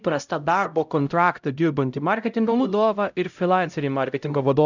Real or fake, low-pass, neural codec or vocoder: fake; 7.2 kHz; codec, 16 kHz, 0.5 kbps, X-Codec, HuBERT features, trained on LibriSpeech